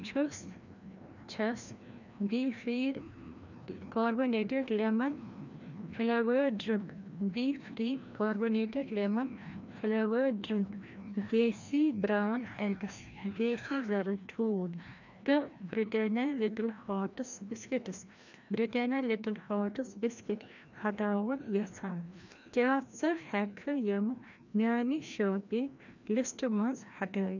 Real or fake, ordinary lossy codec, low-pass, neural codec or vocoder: fake; none; 7.2 kHz; codec, 16 kHz, 1 kbps, FreqCodec, larger model